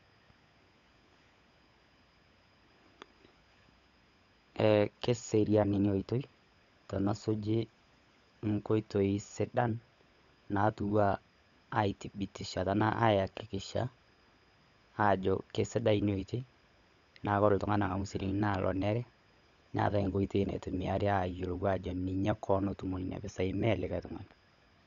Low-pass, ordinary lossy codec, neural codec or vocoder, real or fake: 7.2 kHz; none; codec, 16 kHz, 16 kbps, FunCodec, trained on LibriTTS, 50 frames a second; fake